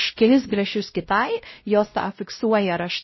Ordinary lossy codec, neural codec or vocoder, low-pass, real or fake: MP3, 24 kbps; codec, 16 kHz, 0.5 kbps, X-Codec, WavLM features, trained on Multilingual LibriSpeech; 7.2 kHz; fake